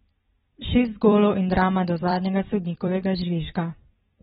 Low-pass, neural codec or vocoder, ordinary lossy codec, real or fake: 19.8 kHz; vocoder, 44.1 kHz, 128 mel bands every 256 samples, BigVGAN v2; AAC, 16 kbps; fake